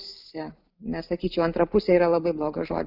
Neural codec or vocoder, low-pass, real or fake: none; 5.4 kHz; real